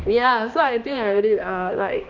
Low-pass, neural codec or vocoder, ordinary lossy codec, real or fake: 7.2 kHz; codec, 16 kHz, 1 kbps, X-Codec, HuBERT features, trained on balanced general audio; none; fake